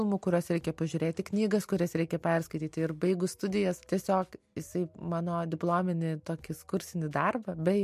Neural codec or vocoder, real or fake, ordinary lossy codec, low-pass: none; real; MP3, 64 kbps; 14.4 kHz